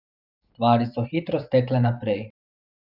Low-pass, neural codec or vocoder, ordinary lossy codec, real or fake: 5.4 kHz; vocoder, 44.1 kHz, 128 mel bands every 512 samples, BigVGAN v2; none; fake